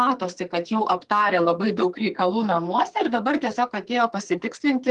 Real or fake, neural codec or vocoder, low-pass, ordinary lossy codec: fake; codec, 44.1 kHz, 2.6 kbps, SNAC; 10.8 kHz; Opus, 24 kbps